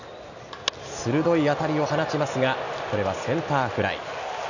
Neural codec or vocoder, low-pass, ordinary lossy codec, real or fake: none; 7.2 kHz; none; real